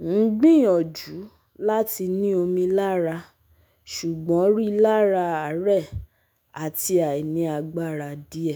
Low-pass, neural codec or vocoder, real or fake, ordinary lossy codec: none; autoencoder, 48 kHz, 128 numbers a frame, DAC-VAE, trained on Japanese speech; fake; none